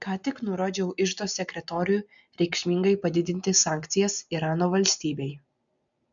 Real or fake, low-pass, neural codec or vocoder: real; 7.2 kHz; none